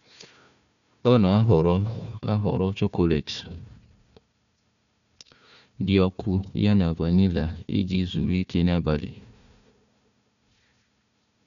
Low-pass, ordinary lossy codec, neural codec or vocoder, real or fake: 7.2 kHz; none; codec, 16 kHz, 1 kbps, FunCodec, trained on Chinese and English, 50 frames a second; fake